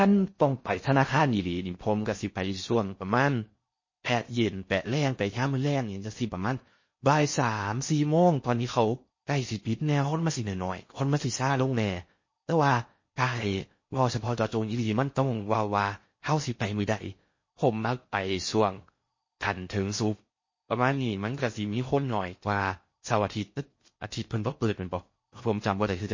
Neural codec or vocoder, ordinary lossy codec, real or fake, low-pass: codec, 16 kHz in and 24 kHz out, 0.6 kbps, FocalCodec, streaming, 4096 codes; MP3, 32 kbps; fake; 7.2 kHz